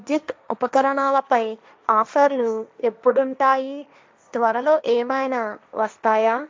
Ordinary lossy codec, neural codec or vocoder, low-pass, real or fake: none; codec, 16 kHz, 1.1 kbps, Voila-Tokenizer; none; fake